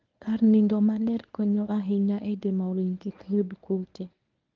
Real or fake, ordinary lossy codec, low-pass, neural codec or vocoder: fake; Opus, 32 kbps; 7.2 kHz; codec, 24 kHz, 0.9 kbps, WavTokenizer, medium speech release version 1